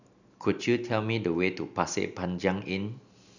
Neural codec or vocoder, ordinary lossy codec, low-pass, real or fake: none; none; 7.2 kHz; real